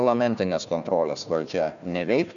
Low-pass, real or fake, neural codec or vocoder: 7.2 kHz; fake; codec, 16 kHz, 1 kbps, FunCodec, trained on Chinese and English, 50 frames a second